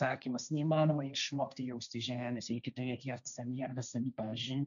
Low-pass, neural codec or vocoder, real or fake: 7.2 kHz; codec, 16 kHz, 1.1 kbps, Voila-Tokenizer; fake